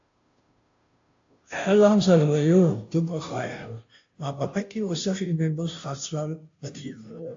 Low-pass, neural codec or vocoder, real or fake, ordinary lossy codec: 7.2 kHz; codec, 16 kHz, 0.5 kbps, FunCodec, trained on Chinese and English, 25 frames a second; fake; AAC, 48 kbps